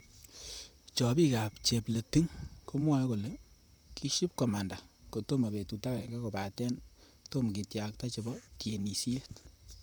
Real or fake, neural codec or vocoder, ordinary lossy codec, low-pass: fake; vocoder, 44.1 kHz, 128 mel bands, Pupu-Vocoder; none; none